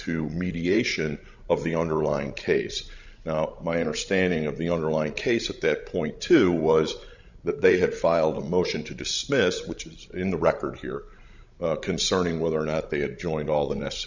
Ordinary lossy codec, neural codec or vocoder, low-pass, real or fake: Opus, 64 kbps; codec, 16 kHz, 16 kbps, FreqCodec, larger model; 7.2 kHz; fake